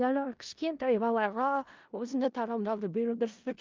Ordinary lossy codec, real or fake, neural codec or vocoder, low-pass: Opus, 24 kbps; fake; codec, 16 kHz in and 24 kHz out, 0.4 kbps, LongCat-Audio-Codec, four codebook decoder; 7.2 kHz